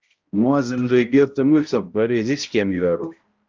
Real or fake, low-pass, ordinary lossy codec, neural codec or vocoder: fake; 7.2 kHz; Opus, 24 kbps; codec, 16 kHz, 0.5 kbps, X-Codec, HuBERT features, trained on balanced general audio